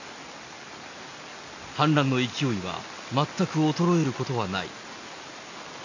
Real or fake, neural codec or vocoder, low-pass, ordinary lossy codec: real; none; 7.2 kHz; AAC, 48 kbps